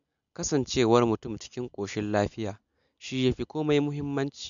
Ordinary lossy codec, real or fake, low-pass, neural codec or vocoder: none; real; 7.2 kHz; none